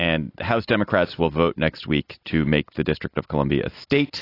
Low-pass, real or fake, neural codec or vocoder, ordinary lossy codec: 5.4 kHz; real; none; AAC, 32 kbps